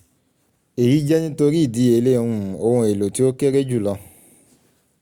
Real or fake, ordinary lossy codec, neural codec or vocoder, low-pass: real; Opus, 64 kbps; none; 19.8 kHz